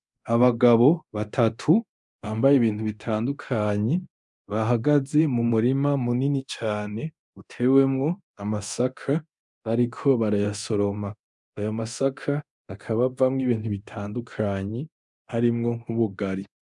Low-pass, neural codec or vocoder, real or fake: 10.8 kHz; codec, 24 kHz, 0.9 kbps, DualCodec; fake